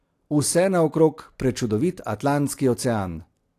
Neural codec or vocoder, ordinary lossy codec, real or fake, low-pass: none; AAC, 64 kbps; real; 14.4 kHz